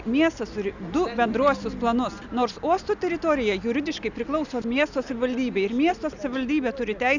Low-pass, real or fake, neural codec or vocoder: 7.2 kHz; real; none